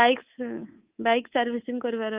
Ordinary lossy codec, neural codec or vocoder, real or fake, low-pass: Opus, 24 kbps; codec, 24 kHz, 3.1 kbps, DualCodec; fake; 3.6 kHz